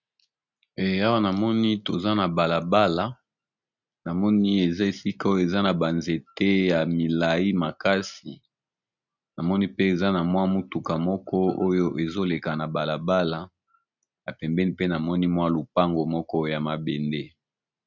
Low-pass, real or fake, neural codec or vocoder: 7.2 kHz; real; none